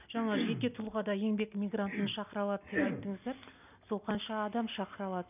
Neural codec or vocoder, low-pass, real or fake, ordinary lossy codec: none; 3.6 kHz; real; none